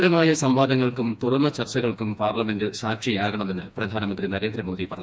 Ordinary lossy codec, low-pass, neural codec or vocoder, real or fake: none; none; codec, 16 kHz, 2 kbps, FreqCodec, smaller model; fake